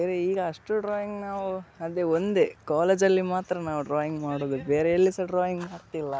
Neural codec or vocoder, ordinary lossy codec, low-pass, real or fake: none; none; none; real